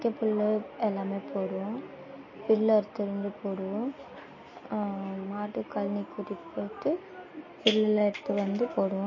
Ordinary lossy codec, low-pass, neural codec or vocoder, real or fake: MP3, 32 kbps; 7.2 kHz; none; real